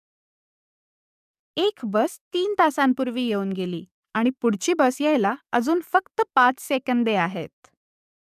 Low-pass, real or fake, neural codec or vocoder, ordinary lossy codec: 14.4 kHz; fake; codec, 44.1 kHz, 7.8 kbps, DAC; none